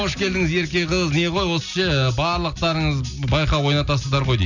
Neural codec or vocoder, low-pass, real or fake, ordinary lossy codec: none; 7.2 kHz; real; none